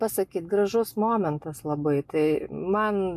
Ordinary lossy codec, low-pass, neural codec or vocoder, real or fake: MP3, 96 kbps; 14.4 kHz; none; real